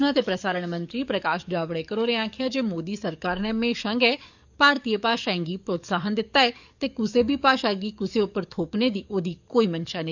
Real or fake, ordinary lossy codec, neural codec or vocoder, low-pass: fake; none; codec, 44.1 kHz, 7.8 kbps, DAC; 7.2 kHz